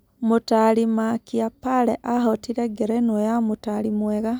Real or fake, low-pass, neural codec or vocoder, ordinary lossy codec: real; none; none; none